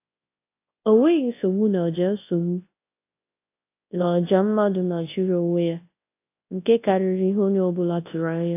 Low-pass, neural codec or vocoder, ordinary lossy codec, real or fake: 3.6 kHz; codec, 24 kHz, 0.9 kbps, WavTokenizer, large speech release; AAC, 24 kbps; fake